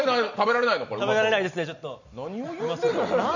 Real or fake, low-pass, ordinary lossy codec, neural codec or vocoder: real; 7.2 kHz; none; none